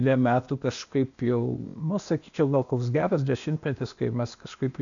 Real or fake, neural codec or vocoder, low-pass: fake; codec, 16 kHz, 0.8 kbps, ZipCodec; 7.2 kHz